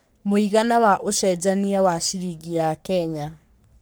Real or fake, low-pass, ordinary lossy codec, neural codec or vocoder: fake; none; none; codec, 44.1 kHz, 3.4 kbps, Pupu-Codec